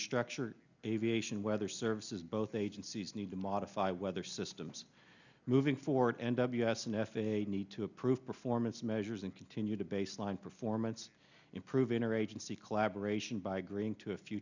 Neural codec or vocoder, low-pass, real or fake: none; 7.2 kHz; real